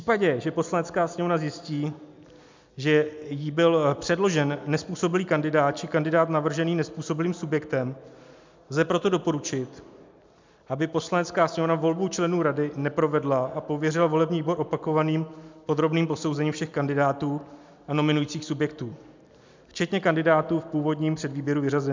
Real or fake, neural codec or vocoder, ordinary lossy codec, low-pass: real; none; MP3, 64 kbps; 7.2 kHz